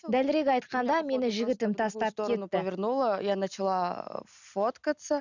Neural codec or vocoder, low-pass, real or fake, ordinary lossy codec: none; 7.2 kHz; real; none